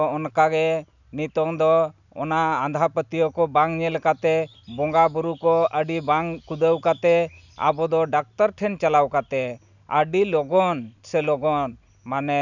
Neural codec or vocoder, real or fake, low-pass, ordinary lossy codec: none; real; 7.2 kHz; none